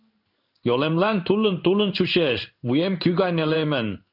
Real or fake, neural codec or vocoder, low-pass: fake; codec, 16 kHz in and 24 kHz out, 1 kbps, XY-Tokenizer; 5.4 kHz